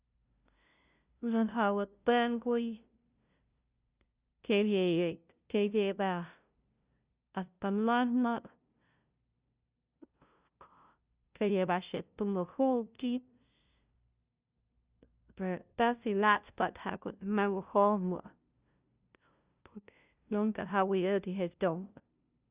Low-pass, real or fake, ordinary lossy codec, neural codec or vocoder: 3.6 kHz; fake; Opus, 64 kbps; codec, 16 kHz, 0.5 kbps, FunCodec, trained on LibriTTS, 25 frames a second